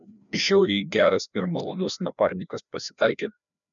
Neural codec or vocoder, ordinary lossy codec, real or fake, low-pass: codec, 16 kHz, 1 kbps, FreqCodec, larger model; MP3, 96 kbps; fake; 7.2 kHz